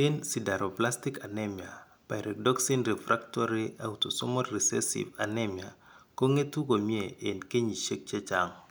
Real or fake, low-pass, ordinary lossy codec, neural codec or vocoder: real; none; none; none